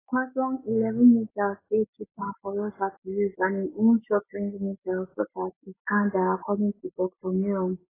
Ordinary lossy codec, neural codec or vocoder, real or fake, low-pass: AAC, 16 kbps; none; real; 3.6 kHz